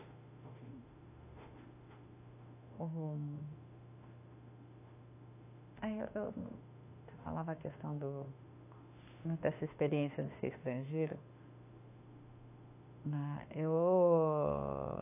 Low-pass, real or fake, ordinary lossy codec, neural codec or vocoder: 3.6 kHz; fake; AAC, 24 kbps; autoencoder, 48 kHz, 32 numbers a frame, DAC-VAE, trained on Japanese speech